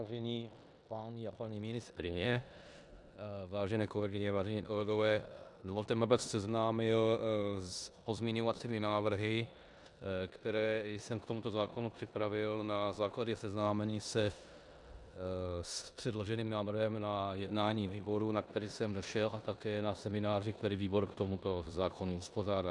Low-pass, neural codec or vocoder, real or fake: 10.8 kHz; codec, 16 kHz in and 24 kHz out, 0.9 kbps, LongCat-Audio-Codec, four codebook decoder; fake